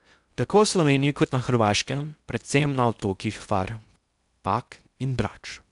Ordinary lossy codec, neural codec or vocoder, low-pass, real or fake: none; codec, 16 kHz in and 24 kHz out, 0.6 kbps, FocalCodec, streaming, 4096 codes; 10.8 kHz; fake